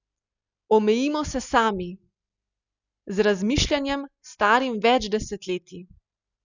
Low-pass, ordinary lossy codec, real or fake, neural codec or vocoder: 7.2 kHz; none; real; none